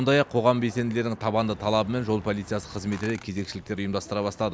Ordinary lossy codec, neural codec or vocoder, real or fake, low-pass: none; none; real; none